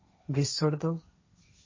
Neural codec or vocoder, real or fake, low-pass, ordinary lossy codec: codec, 16 kHz, 1.1 kbps, Voila-Tokenizer; fake; 7.2 kHz; MP3, 32 kbps